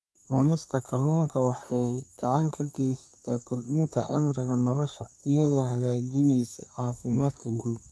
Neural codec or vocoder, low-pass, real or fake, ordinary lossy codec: codec, 24 kHz, 1 kbps, SNAC; none; fake; none